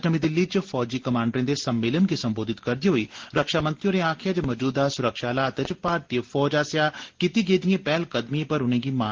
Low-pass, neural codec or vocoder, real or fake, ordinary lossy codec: 7.2 kHz; none; real; Opus, 16 kbps